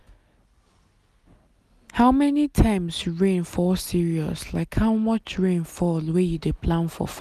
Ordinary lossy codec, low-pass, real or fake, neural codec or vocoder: none; 14.4 kHz; real; none